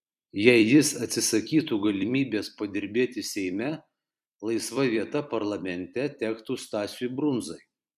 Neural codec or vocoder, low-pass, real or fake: vocoder, 44.1 kHz, 128 mel bands every 256 samples, BigVGAN v2; 14.4 kHz; fake